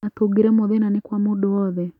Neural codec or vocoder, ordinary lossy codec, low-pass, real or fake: none; none; 19.8 kHz; real